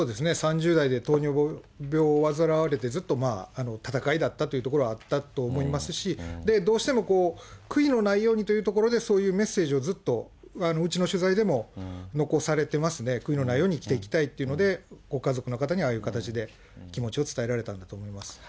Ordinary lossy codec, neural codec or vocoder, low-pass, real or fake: none; none; none; real